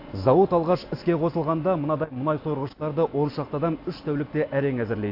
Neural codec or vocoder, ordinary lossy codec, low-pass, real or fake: none; AAC, 32 kbps; 5.4 kHz; real